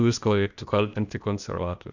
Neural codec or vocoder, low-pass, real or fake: codec, 16 kHz, 0.8 kbps, ZipCodec; 7.2 kHz; fake